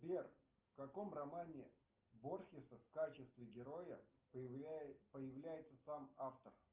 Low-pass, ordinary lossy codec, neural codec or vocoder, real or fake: 3.6 kHz; Opus, 32 kbps; none; real